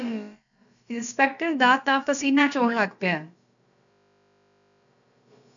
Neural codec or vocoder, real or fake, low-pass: codec, 16 kHz, about 1 kbps, DyCAST, with the encoder's durations; fake; 7.2 kHz